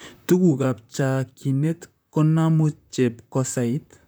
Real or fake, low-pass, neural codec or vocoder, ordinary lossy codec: fake; none; vocoder, 44.1 kHz, 128 mel bands, Pupu-Vocoder; none